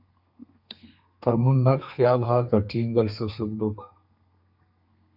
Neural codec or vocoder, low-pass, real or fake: codec, 32 kHz, 1.9 kbps, SNAC; 5.4 kHz; fake